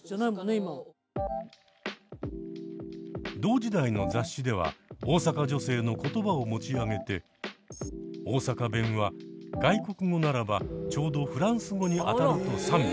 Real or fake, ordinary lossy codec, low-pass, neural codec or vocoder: real; none; none; none